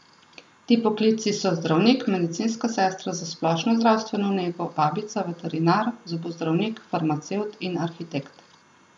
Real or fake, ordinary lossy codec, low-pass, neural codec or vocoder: real; none; 10.8 kHz; none